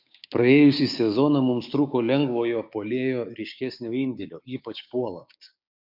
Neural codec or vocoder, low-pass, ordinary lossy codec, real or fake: codec, 16 kHz, 6 kbps, DAC; 5.4 kHz; MP3, 48 kbps; fake